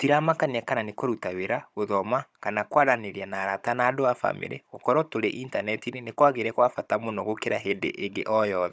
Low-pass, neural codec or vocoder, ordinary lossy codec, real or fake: none; codec, 16 kHz, 16 kbps, FunCodec, trained on Chinese and English, 50 frames a second; none; fake